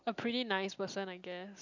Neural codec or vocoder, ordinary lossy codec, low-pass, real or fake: none; none; 7.2 kHz; real